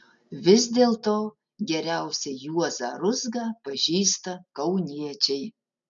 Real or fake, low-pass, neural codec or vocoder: real; 7.2 kHz; none